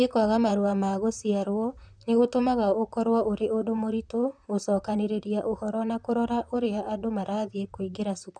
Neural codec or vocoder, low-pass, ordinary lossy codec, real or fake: vocoder, 44.1 kHz, 128 mel bands, Pupu-Vocoder; 9.9 kHz; none; fake